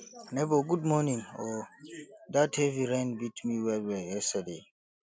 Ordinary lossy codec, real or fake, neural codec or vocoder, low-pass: none; real; none; none